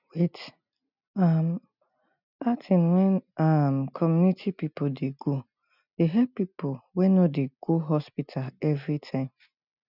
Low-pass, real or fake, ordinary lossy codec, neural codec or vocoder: 5.4 kHz; real; none; none